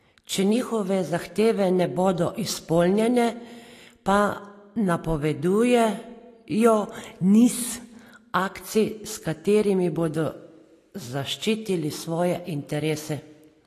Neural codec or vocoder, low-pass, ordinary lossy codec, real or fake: none; 14.4 kHz; AAC, 48 kbps; real